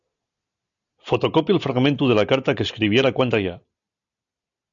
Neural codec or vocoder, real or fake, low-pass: none; real; 7.2 kHz